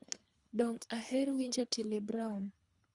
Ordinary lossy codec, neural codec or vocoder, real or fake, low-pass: Opus, 64 kbps; codec, 24 kHz, 3 kbps, HILCodec; fake; 10.8 kHz